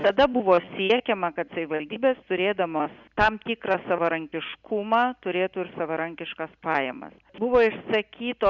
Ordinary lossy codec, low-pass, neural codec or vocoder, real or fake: Opus, 64 kbps; 7.2 kHz; none; real